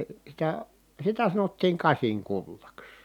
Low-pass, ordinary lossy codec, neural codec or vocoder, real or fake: 19.8 kHz; none; none; real